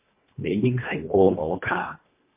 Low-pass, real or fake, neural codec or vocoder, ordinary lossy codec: 3.6 kHz; fake; codec, 24 kHz, 1.5 kbps, HILCodec; MP3, 24 kbps